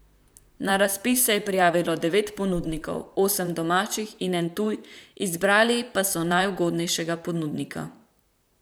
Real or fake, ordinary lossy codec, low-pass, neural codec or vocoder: fake; none; none; vocoder, 44.1 kHz, 128 mel bands, Pupu-Vocoder